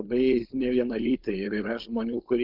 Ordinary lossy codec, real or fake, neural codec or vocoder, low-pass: Opus, 32 kbps; fake; codec, 16 kHz, 4.8 kbps, FACodec; 5.4 kHz